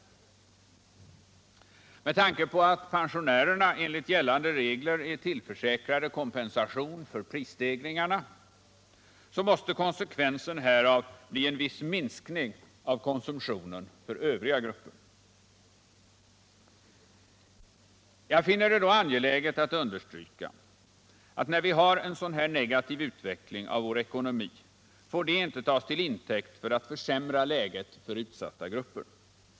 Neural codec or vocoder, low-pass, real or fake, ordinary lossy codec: none; none; real; none